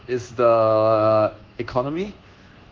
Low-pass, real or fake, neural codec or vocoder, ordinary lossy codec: 7.2 kHz; fake; codec, 24 kHz, 1.2 kbps, DualCodec; Opus, 16 kbps